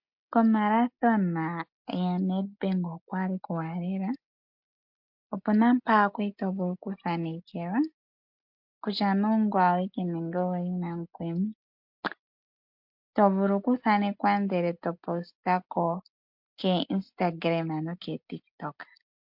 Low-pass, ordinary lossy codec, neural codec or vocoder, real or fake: 5.4 kHz; MP3, 48 kbps; none; real